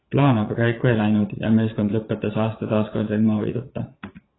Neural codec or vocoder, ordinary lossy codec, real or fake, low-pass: vocoder, 22.05 kHz, 80 mel bands, Vocos; AAC, 16 kbps; fake; 7.2 kHz